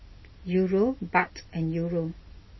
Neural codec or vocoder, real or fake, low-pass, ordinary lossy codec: none; real; 7.2 kHz; MP3, 24 kbps